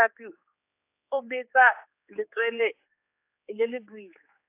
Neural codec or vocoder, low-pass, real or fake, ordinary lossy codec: codec, 16 kHz, 4 kbps, X-Codec, HuBERT features, trained on general audio; 3.6 kHz; fake; AAC, 24 kbps